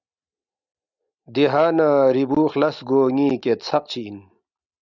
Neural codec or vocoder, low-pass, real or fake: none; 7.2 kHz; real